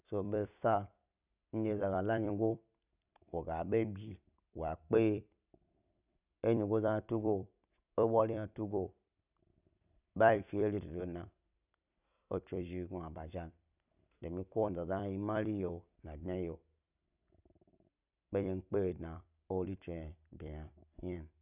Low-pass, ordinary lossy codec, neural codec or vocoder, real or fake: 3.6 kHz; none; vocoder, 44.1 kHz, 128 mel bands every 256 samples, BigVGAN v2; fake